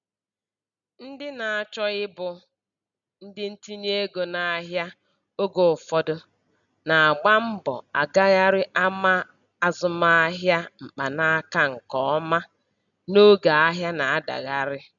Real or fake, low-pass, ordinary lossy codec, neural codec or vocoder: real; 7.2 kHz; none; none